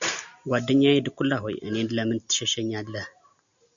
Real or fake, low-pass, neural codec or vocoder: real; 7.2 kHz; none